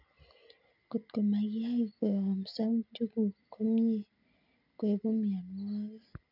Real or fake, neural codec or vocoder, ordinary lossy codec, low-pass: real; none; none; 5.4 kHz